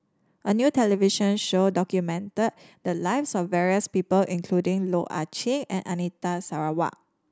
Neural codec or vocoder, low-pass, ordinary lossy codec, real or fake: none; none; none; real